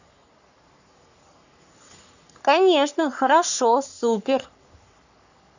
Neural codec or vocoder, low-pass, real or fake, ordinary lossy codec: codec, 44.1 kHz, 3.4 kbps, Pupu-Codec; 7.2 kHz; fake; none